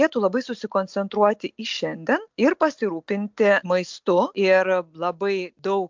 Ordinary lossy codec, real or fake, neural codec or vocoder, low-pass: MP3, 64 kbps; real; none; 7.2 kHz